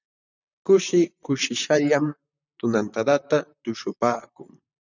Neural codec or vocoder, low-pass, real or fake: vocoder, 44.1 kHz, 128 mel bands, Pupu-Vocoder; 7.2 kHz; fake